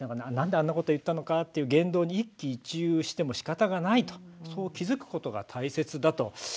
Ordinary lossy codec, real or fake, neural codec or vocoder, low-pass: none; real; none; none